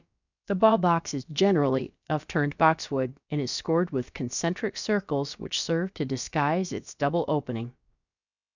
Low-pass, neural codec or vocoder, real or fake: 7.2 kHz; codec, 16 kHz, about 1 kbps, DyCAST, with the encoder's durations; fake